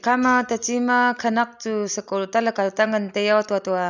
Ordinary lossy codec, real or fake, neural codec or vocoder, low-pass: none; real; none; 7.2 kHz